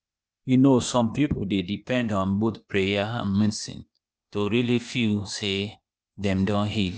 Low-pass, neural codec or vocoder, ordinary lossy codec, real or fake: none; codec, 16 kHz, 0.8 kbps, ZipCodec; none; fake